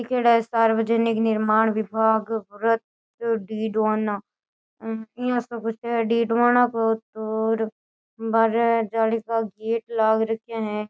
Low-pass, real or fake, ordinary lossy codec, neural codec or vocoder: none; real; none; none